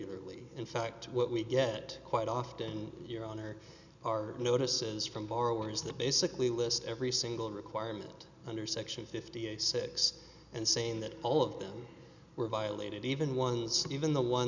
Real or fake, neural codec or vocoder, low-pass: real; none; 7.2 kHz